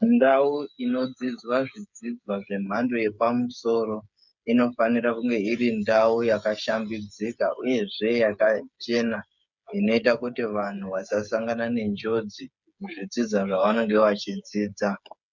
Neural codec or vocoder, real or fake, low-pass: codec, 16 kHz, 8 kbps, FreqCodec, smaller model; fake; 7.2 kHz